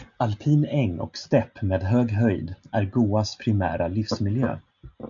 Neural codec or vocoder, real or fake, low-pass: none; real; 7.2 kHz